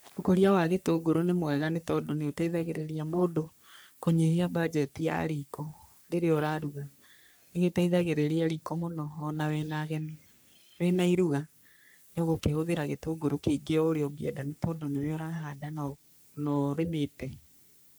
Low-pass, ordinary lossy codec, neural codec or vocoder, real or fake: none; none; codec, 44.1 kHz, 3.4 kbps, Pupu-Codec; fake